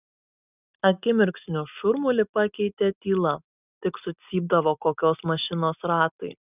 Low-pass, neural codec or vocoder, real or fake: 3.6 kHz; none; real